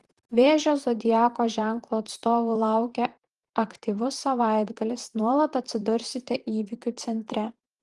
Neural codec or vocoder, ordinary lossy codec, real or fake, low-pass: vocoder, 44.1 kHz, 128 mel bands, Pupu-Vocoder; Opus, 24 kbps; fake; 10.8 kHz